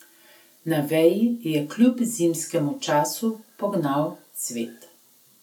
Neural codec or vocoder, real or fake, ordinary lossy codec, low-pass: none; real; none; 19.8 kHz